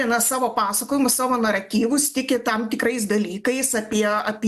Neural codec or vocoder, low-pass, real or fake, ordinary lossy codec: none; 14.4 kHz; real; MP3, 96 kbps